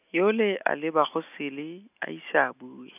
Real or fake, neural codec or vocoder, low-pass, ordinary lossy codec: real; none; 3.6 kHz; none